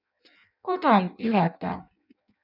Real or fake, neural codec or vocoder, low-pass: fake; codec, 16 kHz in and 24 kHz out, 0.6 kbps, FireRedTTS-2 codec; 5.4 kHz